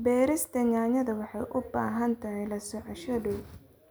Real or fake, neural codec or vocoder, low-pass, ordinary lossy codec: real; none; none; none